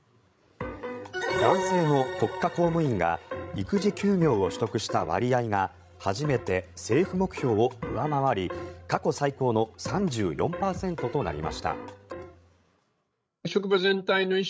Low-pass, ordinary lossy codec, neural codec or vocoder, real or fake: none; none; codec, 16 kHz, 16 kbps, FreqCodec, larger model; fake